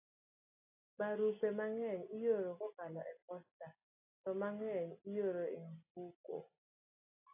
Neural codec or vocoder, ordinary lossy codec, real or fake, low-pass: none; AAC, 32 kbps; real; 3.6 kHz